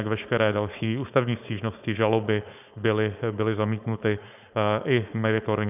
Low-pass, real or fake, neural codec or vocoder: 3.6 kHz; fake; codec, 16 kHz, 4.8 kbps, FACodec